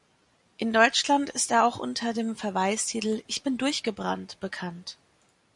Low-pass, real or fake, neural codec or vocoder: 10.8 kHz; real; none